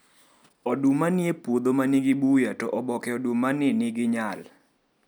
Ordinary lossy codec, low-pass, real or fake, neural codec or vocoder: none; none; real; none